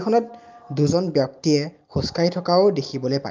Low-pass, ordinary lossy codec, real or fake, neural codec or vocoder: 7.2 kHz; Opus, 24 kbps; real; none